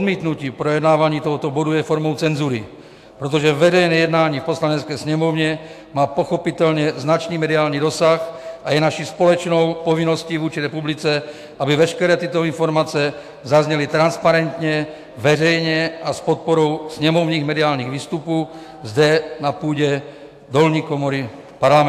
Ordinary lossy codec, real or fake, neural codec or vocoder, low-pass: AAC, 64 kbps; fake; autoencoder, 48 kHz, 128 numbers a frame, DAC-VAE, trained on Japanese speech; 14.4 kHz